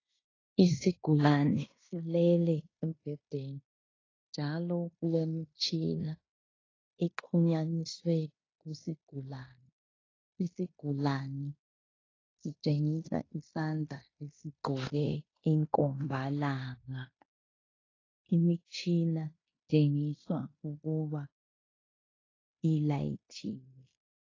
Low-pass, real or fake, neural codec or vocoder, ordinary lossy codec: 7.2 kHz; fake; codec, 16 kHz in and 24 kHz out, 0.9 kbps, LongCat-Audio-Codec, fine tuned four codebook decoder; AAC, 32 kbps